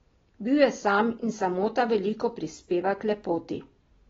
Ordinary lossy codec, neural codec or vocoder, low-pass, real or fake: AAC, 24 kbps; none; 7.2 kHz; real